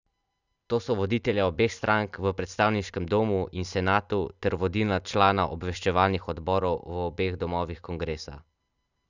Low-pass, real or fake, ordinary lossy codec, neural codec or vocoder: 7.2 kHz; real; none; none